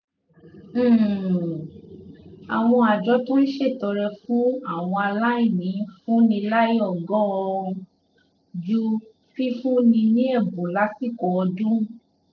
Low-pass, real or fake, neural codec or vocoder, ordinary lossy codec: 7.2 kHz; real; none; none